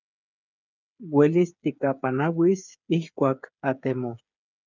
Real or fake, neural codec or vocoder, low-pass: fake; codec, 16 kHz, 8 kbps, FreqCodec, smaller model; 7.2 kHz